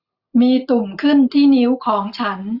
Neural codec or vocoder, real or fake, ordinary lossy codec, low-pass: none; real; Opus, 64 kbps; 5.4 kHz